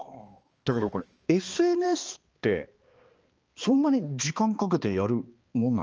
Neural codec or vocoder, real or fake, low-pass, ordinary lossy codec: codec, 16 kHz, 2 kbps, X-Codec, HuBERT features, trained on balanced general audio; fake; 7.2 kHz; Opus, 24 kbps